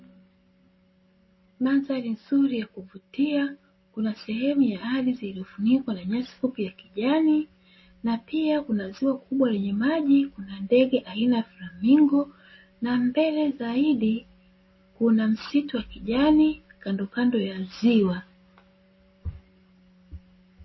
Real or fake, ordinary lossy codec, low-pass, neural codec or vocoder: real; MP3, 24 kbps; 7.2 kHz; none